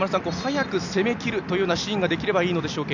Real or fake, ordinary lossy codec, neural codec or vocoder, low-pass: real; none; none; 7.2 kHz